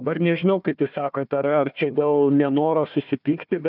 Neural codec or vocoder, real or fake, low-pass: codec, 16 kHz, 1 kbps, FunCodec, trained on Chinese and English, 50 frames a second; fake; 5.4 kHz